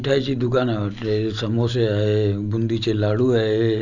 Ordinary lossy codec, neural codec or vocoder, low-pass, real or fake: none; none; 7.2 kHz; real